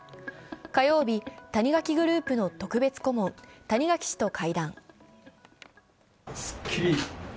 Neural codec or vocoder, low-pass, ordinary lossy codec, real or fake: none; none; none; real